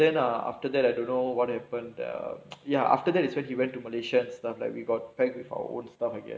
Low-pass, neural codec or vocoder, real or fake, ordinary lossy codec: none; none; real; none